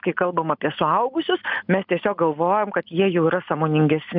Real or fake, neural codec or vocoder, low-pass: real; none; 5.4 kHz